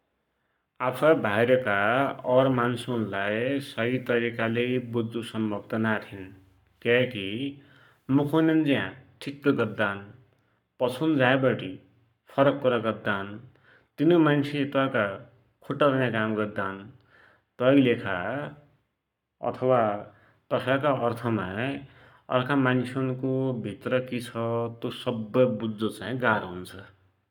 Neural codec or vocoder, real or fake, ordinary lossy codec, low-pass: codec, 44.1 kHz, 7.8 kbps, Pupu-Codec; fake; none; 19.8 kHz